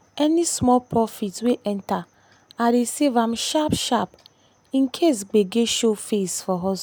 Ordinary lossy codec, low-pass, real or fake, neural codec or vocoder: none; none; real; none